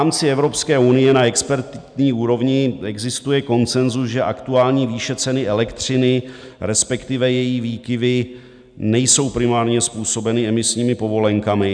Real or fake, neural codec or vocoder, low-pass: real; none; 9.9 kHz